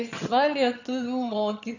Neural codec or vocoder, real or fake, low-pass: vocoder, 22.05 kHz, 80 mel bands, HiFi-GAN; fake; 7.2 kHz